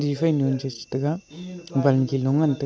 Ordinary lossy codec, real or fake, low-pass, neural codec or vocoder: none; real; none; none